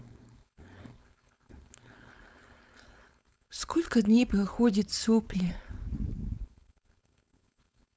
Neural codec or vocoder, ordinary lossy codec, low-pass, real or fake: codec, 16 kHz, 4.8 kbps, FACodec; none; none; fake